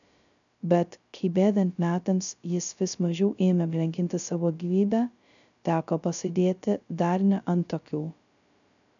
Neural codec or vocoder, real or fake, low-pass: codec, 16 kHz, 0.2 kbps, FocalCodec; fake; 7.2 kHz